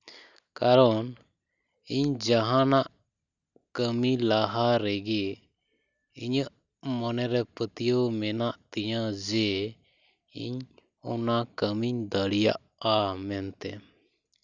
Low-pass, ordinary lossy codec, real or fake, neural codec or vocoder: 7.2 kHz; none; real; none